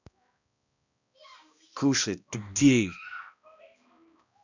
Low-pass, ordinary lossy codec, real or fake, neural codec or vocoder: 7.2 kHz; none; fake; codec, 16 kHz, 1 kbps, X-Codec, HuBERT features, trained on balanced general audio